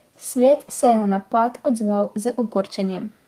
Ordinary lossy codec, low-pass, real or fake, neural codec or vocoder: Opus, 32 kbps; 14.4 kHz; fake; codec, 32 kHz, 1.9 kbps, SNAC